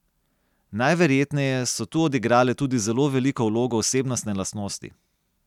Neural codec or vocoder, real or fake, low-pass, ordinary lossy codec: none; real; 19.8 kHz; none